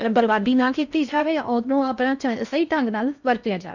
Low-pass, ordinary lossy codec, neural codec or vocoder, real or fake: 7.2 kHz; none; codec, 16 kHz in and 24 kHz out, 0.6 kbps, FocalCodec, streaming, 4096 codes; fake